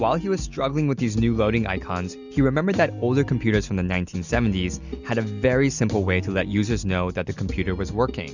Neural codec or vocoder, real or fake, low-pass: none; real; 7.2 kHz